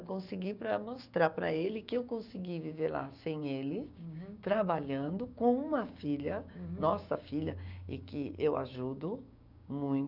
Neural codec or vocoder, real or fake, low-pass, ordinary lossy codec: codec, 16 kHz, 6 kbps, DAC; fake; 5.4 kHz; none